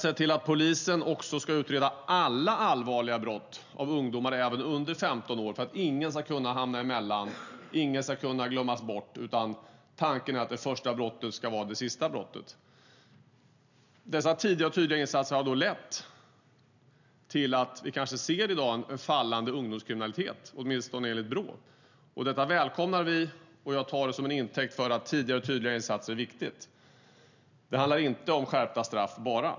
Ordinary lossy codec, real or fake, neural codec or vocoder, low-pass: none; real; none; 7.2 kHz